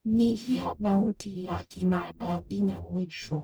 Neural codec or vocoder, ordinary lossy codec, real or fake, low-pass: codec, 44.1 kHz, 0.9 kbps, DAC; none; fake; none